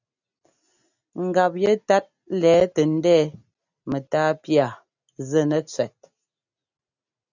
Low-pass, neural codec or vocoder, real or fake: 7.2 kHz; none; real